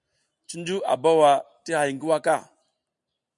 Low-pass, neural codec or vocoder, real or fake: 10.8 kHz; none; real